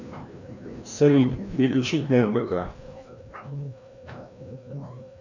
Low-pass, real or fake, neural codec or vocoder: 7.2 kHz; fake; codec, 16 kHz, 1 kbps, FreqCodec, larger model